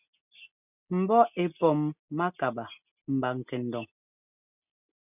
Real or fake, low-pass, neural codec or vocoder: real; 3.6 kHz; none